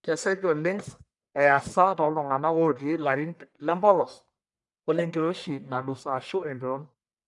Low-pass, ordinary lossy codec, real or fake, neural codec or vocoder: 10.8 kHz; none; fake; codec, 44.1 kHz, 1.7 kbps, Pupu-Codec